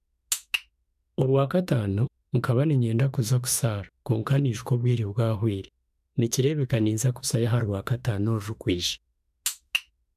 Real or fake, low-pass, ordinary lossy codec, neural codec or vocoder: fake; 14.4 kHz; none; autoencoder, 48 kHz, 32 numbers a frame, DAC-VAE, trained on Japanese speech